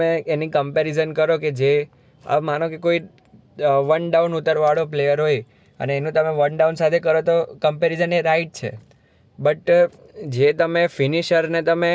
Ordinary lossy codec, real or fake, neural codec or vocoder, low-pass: none; real; none; none